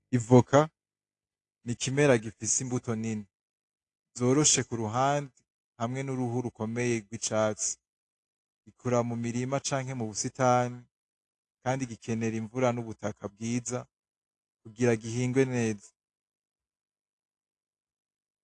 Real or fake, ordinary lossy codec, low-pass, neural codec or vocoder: real; AAC, 48 kbps; 10.8 kHz; none